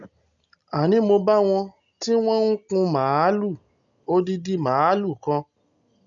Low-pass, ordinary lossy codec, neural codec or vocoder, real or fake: 7.2 kHz; none; none; real